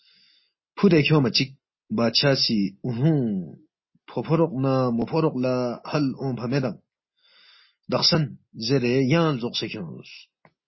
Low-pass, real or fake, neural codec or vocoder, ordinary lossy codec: 7.2 kHz; real; none; MP3, 24 kbps